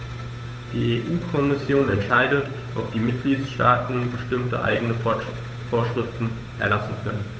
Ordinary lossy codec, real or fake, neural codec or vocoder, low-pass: none; fake; codec, 16 kHz, 8 kbps, FunCodec, trained on Chinese and English, 25 frames a second; none